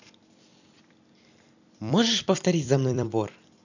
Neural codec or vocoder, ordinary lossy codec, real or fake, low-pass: vocoder, 44.1 kHz, 128 mel bands every 256 samples, BigVGAN v2; none; fake; 7.2 kHz